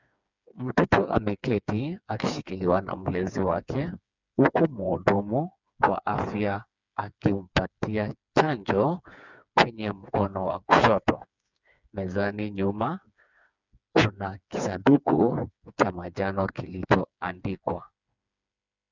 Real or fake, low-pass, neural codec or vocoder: fake; 7.2 kHz; codec, 16 kHz, 4 kbps, FreqCodec, smaller model